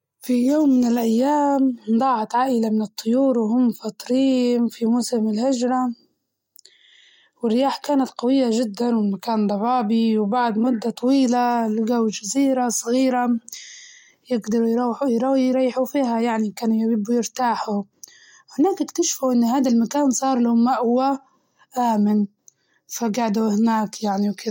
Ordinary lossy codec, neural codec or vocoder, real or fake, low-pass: MP3, 64 kbps; none; real; 19.8 kHz